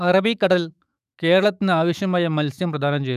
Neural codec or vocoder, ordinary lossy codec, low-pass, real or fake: autoencoder, 48 kHz, 128 numbers a frame, DAC-VAE, trained on Japanese speech; none; 14.4 kHz; fake